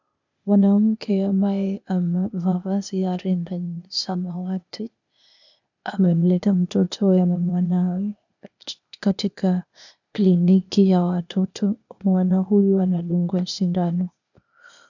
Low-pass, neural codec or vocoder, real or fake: 7.2 kHz; codec, 16 kHz, 0.8 kbps, ZipCodec; fake